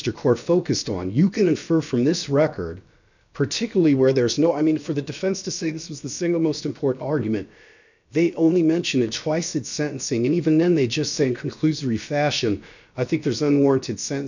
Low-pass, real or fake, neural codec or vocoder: 7.2 kHz; fake; codec, 16 kHz, about 1 kbps, DyCAST, with the encoder's durations